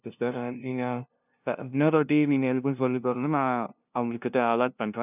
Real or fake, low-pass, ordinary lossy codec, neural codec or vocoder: fake; 3.6 kHz; none; codec, 16 kHz, 0.5 kbps, FunCodec, trained on LibriTTS, 25 frames a second